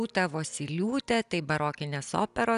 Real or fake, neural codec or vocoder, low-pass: real; none; 10.8 kHz